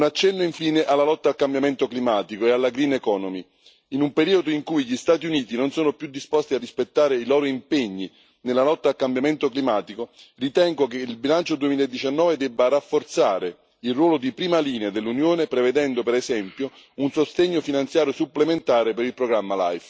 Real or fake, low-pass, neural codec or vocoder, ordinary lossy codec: real; none; none; none